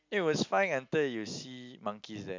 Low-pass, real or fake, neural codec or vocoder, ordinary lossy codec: 7.2 kHz; real; none; MP3, 64 kbps